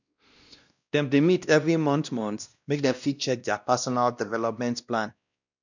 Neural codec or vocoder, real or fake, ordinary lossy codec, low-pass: codec, 16 kHz, 1 kbps, X-Codec, WavLM features, trained on Multilingual LibriSpeech; fake; none; 7.2 kHz